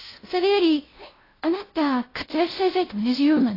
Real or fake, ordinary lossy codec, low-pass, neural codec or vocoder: fake; AAC, 24 kbps; 5.4 kHz; codec, 16 kHz, 0.5 kbps, FunCodec, trained on LibriTTS, 25 frames a second